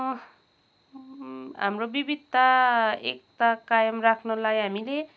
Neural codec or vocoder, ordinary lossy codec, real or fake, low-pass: none; none; real; none